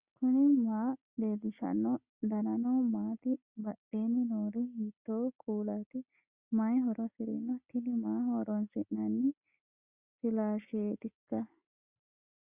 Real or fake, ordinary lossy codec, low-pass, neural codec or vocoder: real; Opus, 64 kbps; 3.6 kHz; none